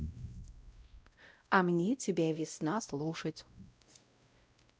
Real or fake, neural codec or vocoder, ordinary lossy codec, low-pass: fake; codec, 16 kHz, 0.5 kbps, X-Codec, WavLM features, trained on Multilingual LibriSpeech; none; none